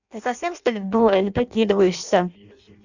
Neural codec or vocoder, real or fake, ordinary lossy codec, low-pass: codec, 16 kHz in and 24 kHz out, 0.6 kbps, FireRedTTS-2 codec; fake; none; 7.2 kHz